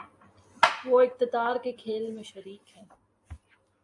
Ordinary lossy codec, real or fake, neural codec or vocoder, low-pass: AAC, 64 kbps; real; none; 10.8 kHz